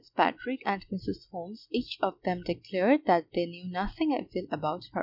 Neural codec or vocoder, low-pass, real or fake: none; 5.4 kHz; real